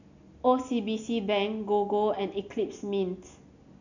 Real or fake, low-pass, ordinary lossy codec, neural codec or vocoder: real; 7.2 kHz; none; none